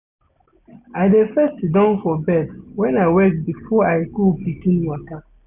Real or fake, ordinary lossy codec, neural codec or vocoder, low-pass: real; none; none; 3.6 kHz